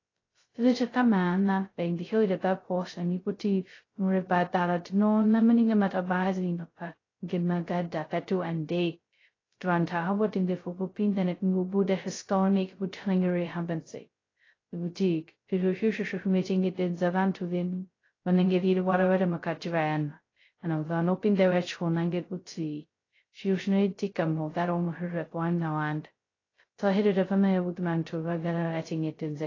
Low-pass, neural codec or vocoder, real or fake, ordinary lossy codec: 7.2 kHz; codec, 16 kHz, 0.2 kbps, FocalCodec; fake; AAC, 32 kbps